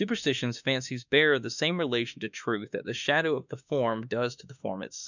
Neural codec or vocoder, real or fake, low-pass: autoencoder, 48 kHz, 32 numbers a frame, DAC-VAE, trained on Japanese speech; fake; 7.2 kHz